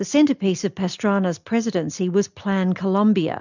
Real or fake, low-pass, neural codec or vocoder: real; 7.2 kHz; none